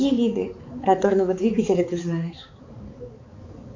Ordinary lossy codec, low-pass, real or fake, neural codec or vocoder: AAC, 48 kbps; 7.2 kHz; fake; codec, 16 kHz, 4 kbps, X-Codec, HuBERT features, trained on balanced general audio